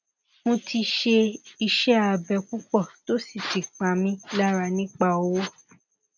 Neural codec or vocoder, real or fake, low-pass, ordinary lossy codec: none; real; 7.2 kHz; none